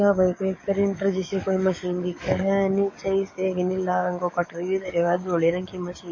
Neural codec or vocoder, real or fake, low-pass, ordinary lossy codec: codec, 44.1 kHz, 7.8 kbps, DAC; fake; 7.2 kHz; MP3, 32 kbps